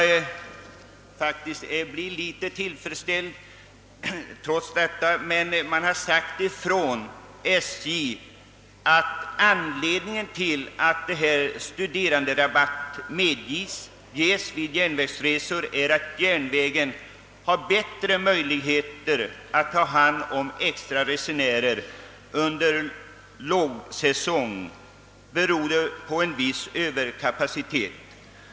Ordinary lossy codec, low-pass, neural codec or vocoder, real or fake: none; none; none; real